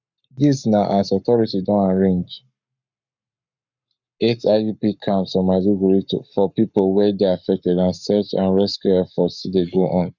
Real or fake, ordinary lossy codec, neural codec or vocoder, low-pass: fake; none; codec, 44.1 kHz, 7.8 kbps, Pupu-Codec; 7.2 kHz